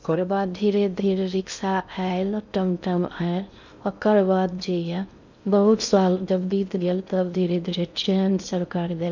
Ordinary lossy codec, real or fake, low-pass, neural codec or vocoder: none; fake; 7.2 kHz; codec, 16 kHz in and 24 kHz out, 0.6 kbps, FocalCodec, streaming, 2048 codes